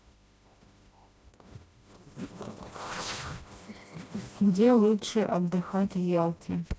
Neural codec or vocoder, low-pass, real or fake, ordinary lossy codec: codec, 16 kHz, 1 kbps, FreqCodec, smaller model; none; fake; none